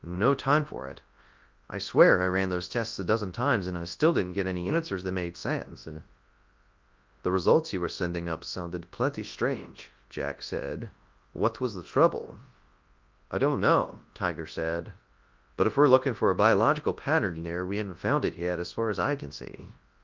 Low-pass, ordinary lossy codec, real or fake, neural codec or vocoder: 7.2 kHz; Opus, 32 kbps; fake; codec, 24 kHz, 0.9 kbps, WavTokenizer, large speech release